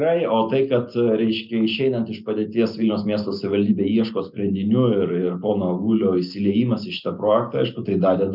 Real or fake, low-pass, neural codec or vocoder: real; 5.4 kHz; none